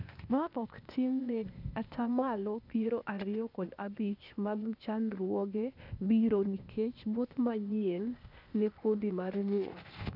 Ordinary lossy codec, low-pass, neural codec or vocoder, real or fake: none; 5.4 kHz; codec, 16 kHz, 0.8 kbps, ZipCodec; fake